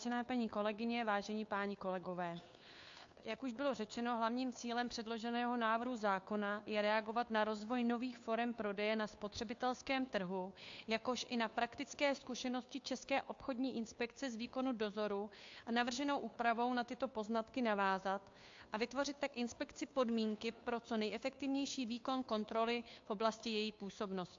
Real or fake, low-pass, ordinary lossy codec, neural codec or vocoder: fake; 7.2 kHz; AAC, 48 kbps; codec, 16 kHz, 2 kbps, FunCodec, trained on Chinese and English, 25 frames a second